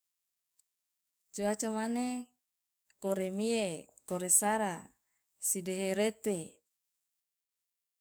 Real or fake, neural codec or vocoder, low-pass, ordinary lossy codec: fake; codec, 44.1 kHz, 7.8 kbps, DAC; none; none